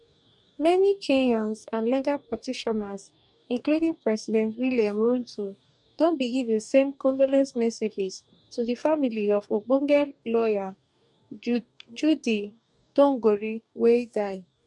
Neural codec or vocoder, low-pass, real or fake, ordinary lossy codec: codec, 44.1 kHz, 2.6 kbps, DAC; 10.8 kHz; fake; none